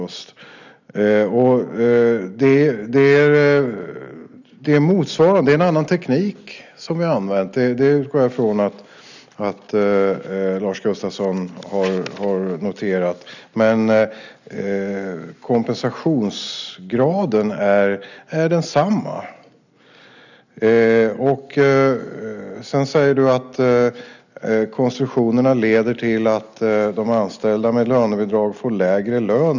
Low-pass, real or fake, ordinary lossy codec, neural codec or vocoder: 7.2 kHz; real; none; none